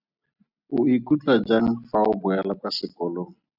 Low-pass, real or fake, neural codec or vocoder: 5.4 kHz; real; none